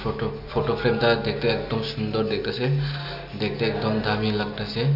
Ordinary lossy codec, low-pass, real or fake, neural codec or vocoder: none; 5.4 kHz; real; none